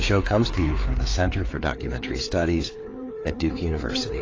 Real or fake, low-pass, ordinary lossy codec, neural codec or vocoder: fake; 7.2 kHz; AAC, 32 kbps; codec, 16 kHz, 4 kbps, FreqCodec, larger model